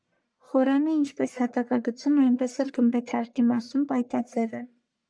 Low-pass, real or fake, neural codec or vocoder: 9.9 kHz; fake; codec, 44.1 kHz, 1.7 kbps, Pupu-Codec